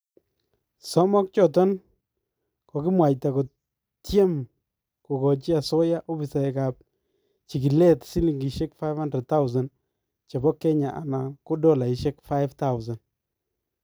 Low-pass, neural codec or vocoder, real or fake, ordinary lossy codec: none; none; real; none